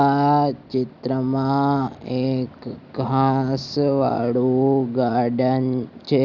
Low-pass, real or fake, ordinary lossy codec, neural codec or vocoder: 7.2 kHz; real; none; none